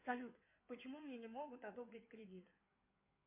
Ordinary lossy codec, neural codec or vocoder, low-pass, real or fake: MP3, 24 kbps; codec, 16 kHz in and 24 kHz out, 2.2 kbps, FireRedTTS-2 codec; 3.6 kHz; fake